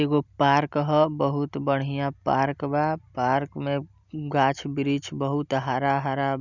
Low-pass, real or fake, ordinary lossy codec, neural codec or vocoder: 7.2 kHz; real; none; none